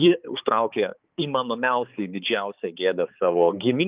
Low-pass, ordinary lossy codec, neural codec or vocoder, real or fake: 3.6 kHz; Opus, 32 kbps; codec, 16 kHz, 4 kbps, X-Codec, HuBERT features, trained on balanced general audio; fake